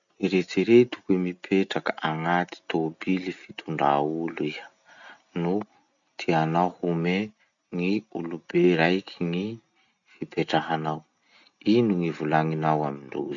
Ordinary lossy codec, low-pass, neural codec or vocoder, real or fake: none; 7.2 kHz; none; real